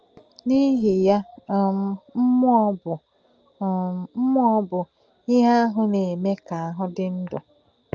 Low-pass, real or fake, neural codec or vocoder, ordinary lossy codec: 7.2 kHz; real; none; Opus, 24 kbps